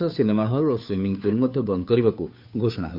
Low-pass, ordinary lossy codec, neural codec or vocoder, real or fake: 5.4 kHz; none; codec, 16 kHz, 2 kbps, FunCodec, trained on Chinese and English, 25 frames a second; fake